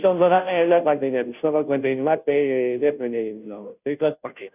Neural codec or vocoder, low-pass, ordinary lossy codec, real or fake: codec, 16 kHz, 0.5 kbps, FunCodec, trained on Chinese and English, 25 frames a second; 3.6 kHz; none; fake